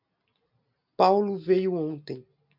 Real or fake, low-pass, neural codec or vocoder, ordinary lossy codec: real; 5.4 kHz; none; MP3, 48 kbps